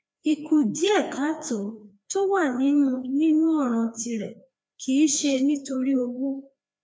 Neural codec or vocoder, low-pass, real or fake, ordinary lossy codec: codec, 16 kHz, 2 kbps, FreqCodec, larger model; none; fake; none